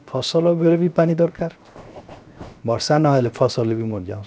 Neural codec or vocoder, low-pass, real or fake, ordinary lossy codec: codec, 16 kHz, 0.7 kbps, FocalCodec; none; fake; none